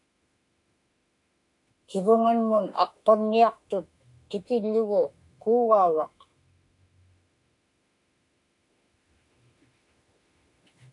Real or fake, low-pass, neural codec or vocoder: fake; 10.8 kHz; autoencoder, 48 kHz, 32 numbers a frame, DAC-VAE, trained on Japanese speech